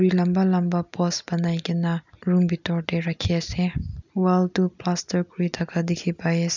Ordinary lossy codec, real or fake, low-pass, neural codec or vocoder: none; real; 7.2 kHz; none